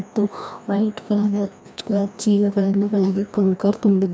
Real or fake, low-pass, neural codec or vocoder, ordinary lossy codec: fake; none; codec, 16 kHz, 1 kbps, FreqCodec, larger model; none